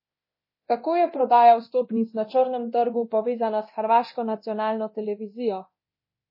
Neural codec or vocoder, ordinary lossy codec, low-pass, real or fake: codec, 24 kHz, 0.9 kbps, DualCodec; MP3, 24 kbps; 5.4 kHz; fake